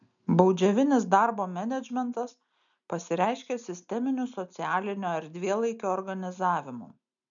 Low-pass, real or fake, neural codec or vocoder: 7.2 kHz; real; none